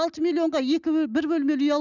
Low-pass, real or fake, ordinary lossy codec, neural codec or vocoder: 7.2 kHz; real; none; none